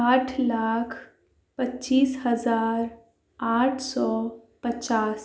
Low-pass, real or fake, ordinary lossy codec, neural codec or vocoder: none; real; none; none